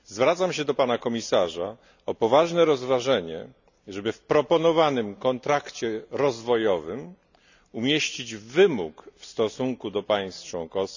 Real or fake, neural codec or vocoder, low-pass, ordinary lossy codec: real; none; 7.2 kHz; none